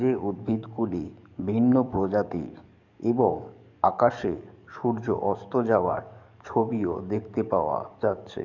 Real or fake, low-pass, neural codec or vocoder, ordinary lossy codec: fake; 7.2 kHz; codec, 44.1 kHz, 7.8 kbps, DAC; none